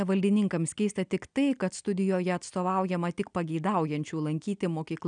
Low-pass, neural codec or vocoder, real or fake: 9.9 kHz; none; real